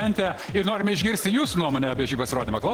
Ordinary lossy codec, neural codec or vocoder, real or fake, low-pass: Opus, 16 kbps; vocoder, 48 kHz, 128 mel bands, Vocos; fake; 19.8 kHz